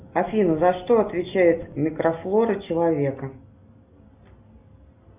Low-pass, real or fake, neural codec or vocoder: 3.6 kHz; real; none